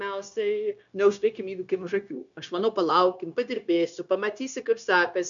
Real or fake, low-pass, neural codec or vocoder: fake; 7.2 kHz; codec, 16 kHz, 0.9 kbps, LongCat-Audio-Codec